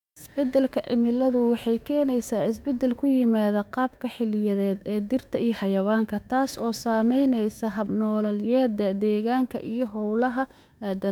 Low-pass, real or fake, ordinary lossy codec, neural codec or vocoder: 19.8 kHz; fake; none; autoencoder, 48 kHz, 32 numbers a frame, DAC-VAE, trained on Japanese speech